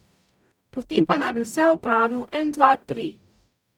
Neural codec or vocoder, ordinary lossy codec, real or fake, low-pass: codec, 44.1 kHz, 0.9 kbps, DAC; none; fake; 19.8 kHz